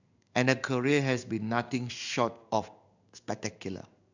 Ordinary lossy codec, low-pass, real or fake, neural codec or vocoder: none; 7.2 kHz; fake; codec, 16 kHz in and 24 kHz out, 1 kbps, XY-Tokenizer